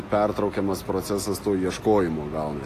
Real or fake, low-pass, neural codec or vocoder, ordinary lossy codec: real; 14.4 kHz; none; AAC, 48 kbps